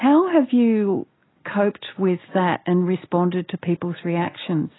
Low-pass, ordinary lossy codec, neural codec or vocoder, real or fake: 7.2 kHz; AAC, 16 kbps; none; real